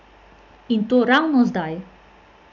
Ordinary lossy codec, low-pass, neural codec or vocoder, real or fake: none; 7.2 kHz; none; real